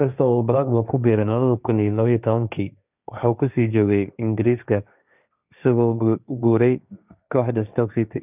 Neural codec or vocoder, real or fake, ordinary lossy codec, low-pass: codec, 16 kHz, 1.1 kbps, Voila-Tokenizer; fake; none; 3.6 kHz